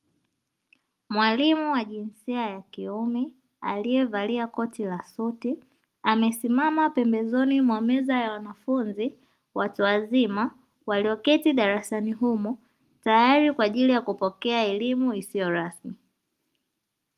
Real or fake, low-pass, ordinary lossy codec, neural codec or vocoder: real; 14.4 kHz; Opus, 32 kbps; none